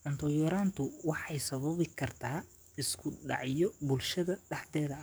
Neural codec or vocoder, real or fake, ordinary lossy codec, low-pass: codec, 44.1 kHz, 7.8 kbps, DAC; fake; none; none